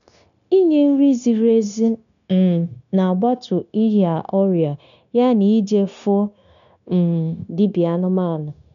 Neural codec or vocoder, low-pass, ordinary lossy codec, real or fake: codec, 16 kHz, 0.9 kbps, LongCat-Audio-Codec; 7.2 kHz; none; fake